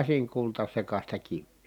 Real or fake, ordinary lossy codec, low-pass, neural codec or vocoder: real; none; 19.8 kHz; none